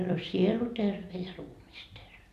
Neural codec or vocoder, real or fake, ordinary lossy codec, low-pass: vocoder, 44.1 kHz, 128 mel bands every 256 samples, BigVGAN v2; fake; AAC, 96 kbps; 14.4 kHz